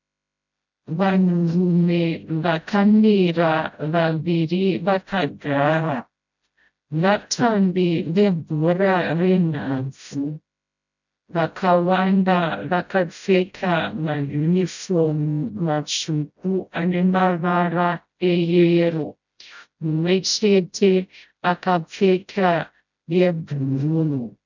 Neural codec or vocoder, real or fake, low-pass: codec, 16 kHz, 0.5 kbps, FreqCodec, smaller model; fake; 7.2 kHz